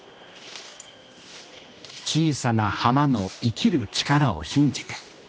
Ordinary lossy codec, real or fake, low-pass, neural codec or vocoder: none; fake; none; codec, 16 kHz, 1 kbps, X-Codec, HuBERT features, trained on general audio